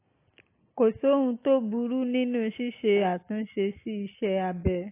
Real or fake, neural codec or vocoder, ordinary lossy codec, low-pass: real; none; AAC, 24 kbps; 3.6 kHz